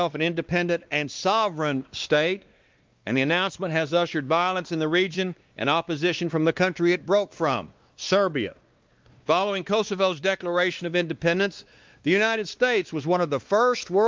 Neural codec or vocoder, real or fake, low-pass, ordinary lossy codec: codec, 16 kHz, 2 kbps, X-Codec, WavLM features, trained on Multilingual LibriSpeech; fake; 7.2 kHz; Opus, 24 kbps